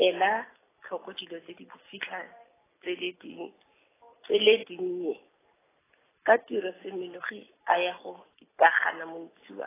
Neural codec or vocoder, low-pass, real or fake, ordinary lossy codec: none; 3.6 kHz; real; AAC, 16 kbps